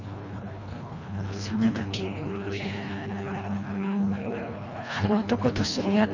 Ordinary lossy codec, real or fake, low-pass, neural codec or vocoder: none; fake; 7.2 kHz; codec, 24 kHz, 1.5 kbps, HILCodec